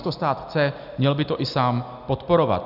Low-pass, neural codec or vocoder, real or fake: 5.4 kHz; none; real